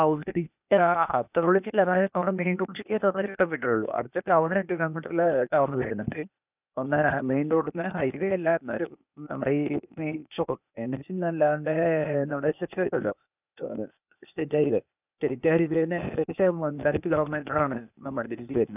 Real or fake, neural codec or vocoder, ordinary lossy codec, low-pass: fake; codec, 16 kHz, 0.8 kbps, ZipCodec; none; 3.6 kHz